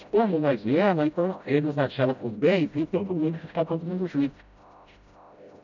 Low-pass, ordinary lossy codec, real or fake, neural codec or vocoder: 7.2 kHz; none; fake; codec, 16 kHz, 0.5 kbps, FreqCodec, smaller model